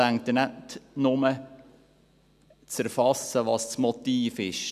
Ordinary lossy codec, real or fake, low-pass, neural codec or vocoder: none; real; 14.4 kHz; none